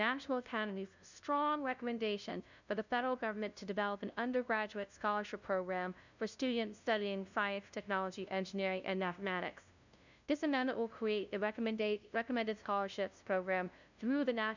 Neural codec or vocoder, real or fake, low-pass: codec, 16 kHz, 0.5 kbps, FunCodec, trained on LibriTTS, 25 frames a second; fake; 7.2 kHz